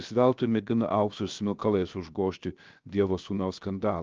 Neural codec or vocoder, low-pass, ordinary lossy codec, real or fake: codec, 16 kHz, 0.7 kbps, FocalCodec; 7.2 kHz; Opus, 24 kbps; fake